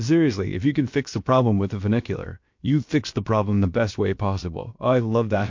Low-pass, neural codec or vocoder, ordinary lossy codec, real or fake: 7.2 kHz; codec, 16 kHz, about 1 kbps, DyCAST, with the encoder's durations; MP3, 48 kbps; fake